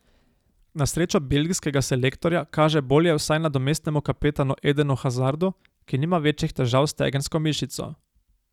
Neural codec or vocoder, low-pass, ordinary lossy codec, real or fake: none; 19.8 kHz; none; real